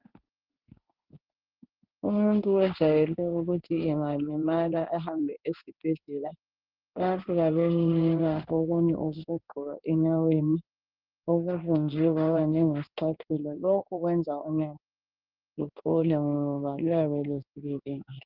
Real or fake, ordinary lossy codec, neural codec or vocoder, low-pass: fake; Opus, 32 kbps; codec, 16 kHz in and 24 kHz out, 1 kbps, XY-Tokenizer; 5.4 kHz